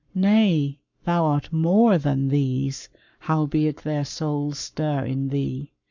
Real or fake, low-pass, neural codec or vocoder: fake; 7.2 kHz; codec, 44.1 kHz, 7.8 kbps, Pupu-Codec